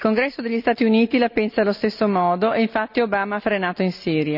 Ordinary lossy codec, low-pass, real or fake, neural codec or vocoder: none; 5.4 kHz; real; none